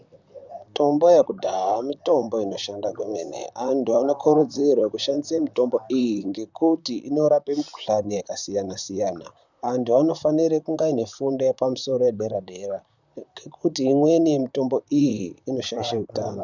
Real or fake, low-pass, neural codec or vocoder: fake; 7.2 kHz; vocoder, 44.1 kHz, 128 mel bands, Pupu-Vocoder